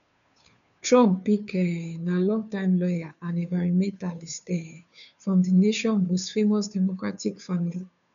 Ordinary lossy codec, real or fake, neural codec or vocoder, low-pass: none; fake; codec, 16 kHz, 2 kbps, FunCodec, trained on Chinese and English, 25 frames a second; 7.2 kHz